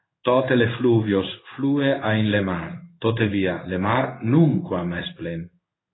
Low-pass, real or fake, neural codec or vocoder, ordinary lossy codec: 7.2 kHz; fake; codec, 16 kHz in and 24 kHz out, 1 kbps, XY-Tokenizer; AAC, 16 kbps